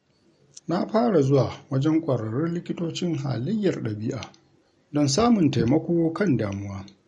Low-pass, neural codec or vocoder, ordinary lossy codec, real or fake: 19.8 kHz; none; MP3, 48 kbps; real